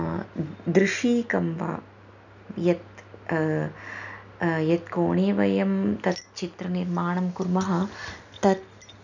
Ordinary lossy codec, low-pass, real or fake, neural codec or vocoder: none; 7.2 kHz; real; none